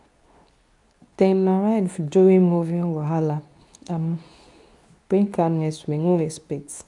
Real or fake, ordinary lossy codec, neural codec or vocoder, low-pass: fake; none; codec, 24 kHz, 0.9 kbps, WavTokenizer, medium speech release version 2; 10.8 kHz